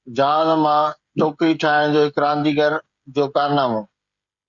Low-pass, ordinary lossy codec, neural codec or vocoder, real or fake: 7.2 kHz; Opus, 64 kbps; codec, 16 kHz, 16 kbps, FreqCodec, smaller model; fake